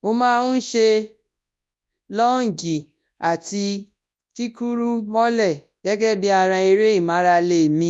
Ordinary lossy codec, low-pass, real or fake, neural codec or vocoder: none; none; fake; codec, 24 kHz, 0.9 kbps, WavTokenizer, large speech release